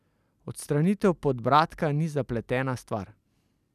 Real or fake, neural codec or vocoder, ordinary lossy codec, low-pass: real; none; none; 14.4 kHz